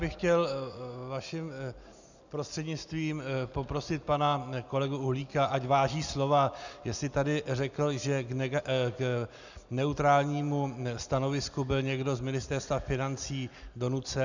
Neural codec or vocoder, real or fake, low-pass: none; real; 7.2 kHz